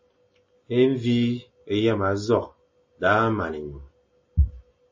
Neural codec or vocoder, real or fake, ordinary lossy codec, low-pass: none; real; MP3, 32 kbps; 7.2 kHz